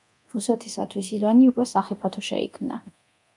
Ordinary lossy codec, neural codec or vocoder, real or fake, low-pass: MP3, 96 kbps; codec, 24 kHz, 0.9 kbps, DualCodec; fake; 10.8 kHz